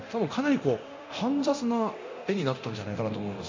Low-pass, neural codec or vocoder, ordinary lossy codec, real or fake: 7.2 kHz; codec, 24 kHz, 0.9 kbps, DualCodec; MP3, 48 kbps; fake